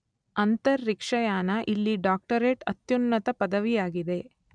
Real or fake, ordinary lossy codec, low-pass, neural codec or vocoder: real; none; 9.9 kHz; none